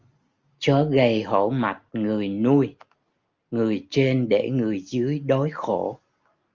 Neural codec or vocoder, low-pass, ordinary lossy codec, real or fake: none; 7.2 kHz; Opus, 32 kbps; real